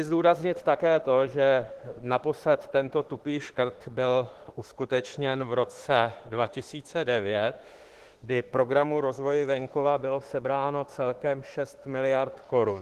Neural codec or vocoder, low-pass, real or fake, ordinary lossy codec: autoencoder, 48 kHz, 32 numbers a frame, DAC-VAE, trained on Japanese speech; 14.4 kHz; fake; Opus, 16 kbps